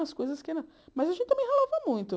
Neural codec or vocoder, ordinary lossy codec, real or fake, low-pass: none; none; real; none